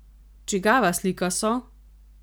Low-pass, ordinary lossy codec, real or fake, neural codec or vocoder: none; none; real; none